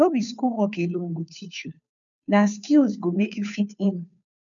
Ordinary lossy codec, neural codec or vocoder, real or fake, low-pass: none; codec, 16 kHz, 2 kbps, FunCodec, trained on Chinese and English, 25 frames a second; fake; 7.2 kHz